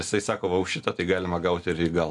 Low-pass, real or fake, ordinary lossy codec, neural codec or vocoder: 10.8 kHz; real; MP3, 64 kbps; none